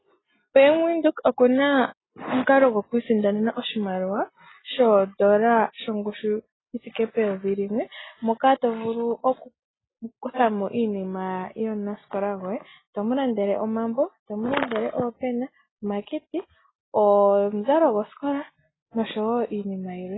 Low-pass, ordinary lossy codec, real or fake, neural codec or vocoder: 7.2 kHz; AAC, 16 kbps; real; none